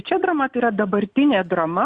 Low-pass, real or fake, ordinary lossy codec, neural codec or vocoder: 9.9 kHz; real; Opus, 64 kbps; none